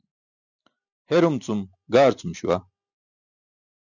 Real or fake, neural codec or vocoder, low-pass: real; none; 7.2 kHz